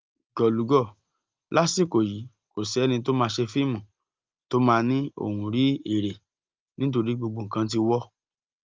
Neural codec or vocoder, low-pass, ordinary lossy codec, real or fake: none; 7.2 kHz; Opus, 24 kbps; real